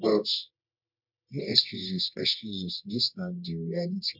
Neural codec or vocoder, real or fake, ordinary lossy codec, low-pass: codec, 24 kHz, 0.9 kbps, WavTokenizer, medium music audio release; fake; none; 5.4 kHz